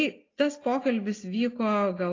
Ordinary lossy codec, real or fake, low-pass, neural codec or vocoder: AAC, 32 kbps; real; 7.2 kHz; none